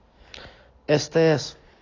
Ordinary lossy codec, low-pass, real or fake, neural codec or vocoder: AAC, 32 kbps; 7.2 kHz; real; none